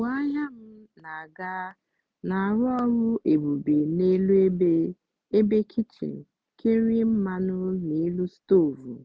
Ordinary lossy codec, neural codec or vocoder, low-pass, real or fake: none; none; none; real